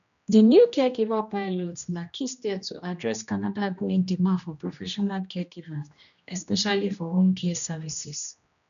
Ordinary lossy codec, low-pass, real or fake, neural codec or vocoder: none; 7.2 kHz; fake; codec, 16 kHz, 1 kbps, X-Codec, HuBERT features, trained on general audio